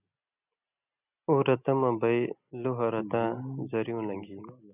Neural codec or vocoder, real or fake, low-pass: none; real; 3.6 kHz